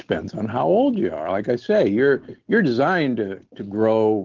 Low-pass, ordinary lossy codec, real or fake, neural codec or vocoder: 7.2 kHz; Opus, 16 kbps; real; none